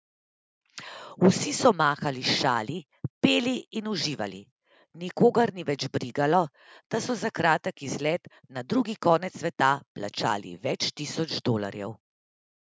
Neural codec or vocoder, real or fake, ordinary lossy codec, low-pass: none; real; none; none